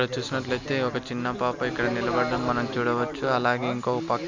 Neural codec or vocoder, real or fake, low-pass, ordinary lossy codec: none; real; 7.2 kHz; MP3, 64 kbps